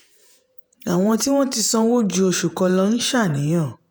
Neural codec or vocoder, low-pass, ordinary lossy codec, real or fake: vocoder, 48 kHz, 128 mel bands, Vocos; none; none; fake